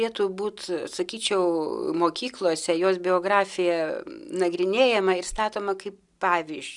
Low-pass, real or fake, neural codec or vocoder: 10.8 kHz; real; none